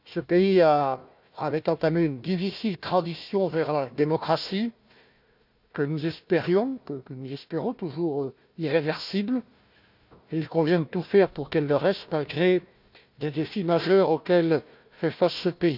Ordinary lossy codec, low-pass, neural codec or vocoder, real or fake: none; 5.4 kHz; codec, 16 kHz, 1 kbps, FunCodec, trained on Chinese and English, 50 frames a second; fake